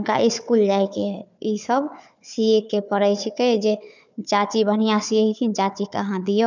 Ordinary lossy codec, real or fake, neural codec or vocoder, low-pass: none; fake; codec, 16 kHz, 4 kbps, FunCodec, trained on Chinese and English, 50 frames a second; 7.2 kHz